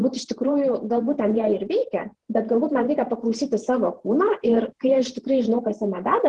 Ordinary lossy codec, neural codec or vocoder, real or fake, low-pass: Opus, 16 kbps; vocoder, 48 kHz, 128 mel bands, Vocos; fake; 10.8 kHz